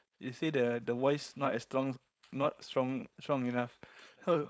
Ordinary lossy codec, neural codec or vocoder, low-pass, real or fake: none; codec, 16 kHz, 4.8 kbps, FACodec; none; fake